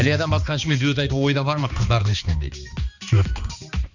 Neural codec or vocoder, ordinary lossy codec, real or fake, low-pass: codec, 16 kHz, 2 kbps, X-Codec, HuBERT features, trained on balanced general audio; none; fake; 7.2 kHz